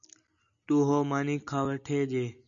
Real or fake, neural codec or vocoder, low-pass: real; none; 7.2 kHz